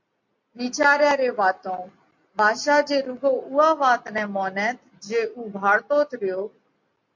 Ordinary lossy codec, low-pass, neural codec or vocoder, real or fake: MP3, 48 kbps; 7.2 kHz; none; real